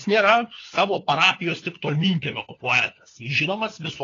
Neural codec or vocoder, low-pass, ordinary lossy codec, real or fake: codec, 16 kHz, 4 kbps, FunCodec, trained on LibriTTS, 50 frames a second; 7.2 kHz; AAC, 32 kbps; fake